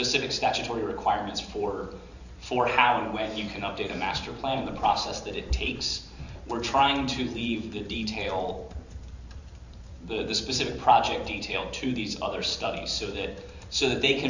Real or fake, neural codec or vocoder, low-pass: fake; vocoder, 44.1 kHz, 128 mel bands every 512 samples, BigVGAN v2; 7.2 kHz